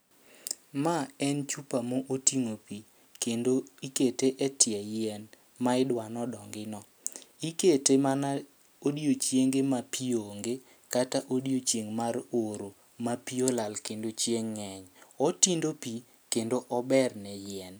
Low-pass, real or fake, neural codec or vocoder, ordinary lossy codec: none; real; none; none